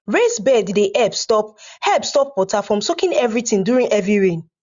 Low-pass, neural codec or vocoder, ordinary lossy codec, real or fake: 7.2 kHz; none; Opus, 64 kbps; real